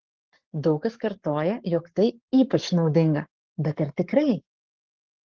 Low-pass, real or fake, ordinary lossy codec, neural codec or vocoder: 7.2 kHz; fake; Opus, 16 kbps; codec, 44.1 kHz, 7.8 kbps, Pupu-Codec